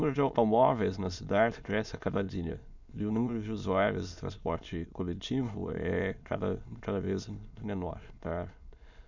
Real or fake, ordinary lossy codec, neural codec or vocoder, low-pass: fake; none; autoencoder, 22.05 kHz, a latent of 192 numbers a frame, VITS, trained on many speakers; 7.2 kHz